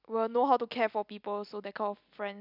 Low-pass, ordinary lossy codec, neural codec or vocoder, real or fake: 5.4 kHz; none; none; real